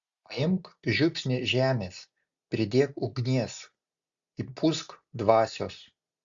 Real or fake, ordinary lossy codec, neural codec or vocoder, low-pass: real; Opus, 64 kbps; none; 7.2 kHz